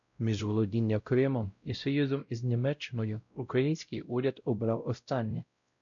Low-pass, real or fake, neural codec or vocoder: 7.2 kHz; fake; codec, 16 kHz, 0.5 kbps, X-Codec, WavLM features, trained on Multilingual LibriSpeech